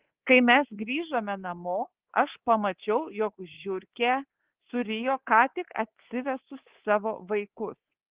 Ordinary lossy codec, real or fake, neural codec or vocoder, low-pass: Opus, 16 kbps; fake; codec, 24 kHz, 3.1 kbps, DualCodec; 3.6 kHz